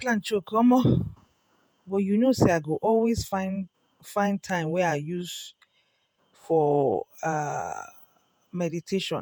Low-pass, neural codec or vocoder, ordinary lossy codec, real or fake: none; vocoder, 48 kHz, 128 mel bands, Vocos; none; fake